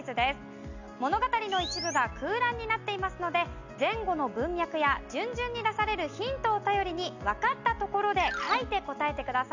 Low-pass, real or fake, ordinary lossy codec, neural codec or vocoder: 7.2 kHz; real; none; none